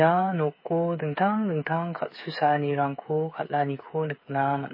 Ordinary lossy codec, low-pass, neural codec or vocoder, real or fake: MP3, 24 kbps; 5.4 kHz; codec, 16 kHz, 8 kbps, FreqCodec, smaller model; fake